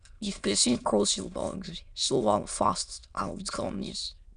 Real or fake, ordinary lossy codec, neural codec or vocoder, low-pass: fake; none; autoencoder, 22.05 kHz, a latent of 192 numbers a frame, VITS, trained on many speakers; 9.9 kHz